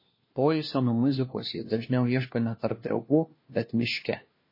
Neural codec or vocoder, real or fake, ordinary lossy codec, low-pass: codec, 16 kHz, 1 kbps, FunCodec, trained on LibriTTS, 50 frames a second; fake; MP3, 24 kbps; 5.4 kHz